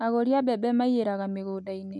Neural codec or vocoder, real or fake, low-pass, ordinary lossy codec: none; real; 10.8 kHz; none